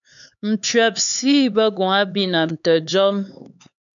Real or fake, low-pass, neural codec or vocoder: fake; 7.2 kHz; codec, 16 kHz, 4 kbps, X-Codec, HuBERT features, trained on LibriSpeech